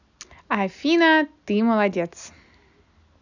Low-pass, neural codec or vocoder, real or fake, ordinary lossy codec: 7.2 kHz; none; real; none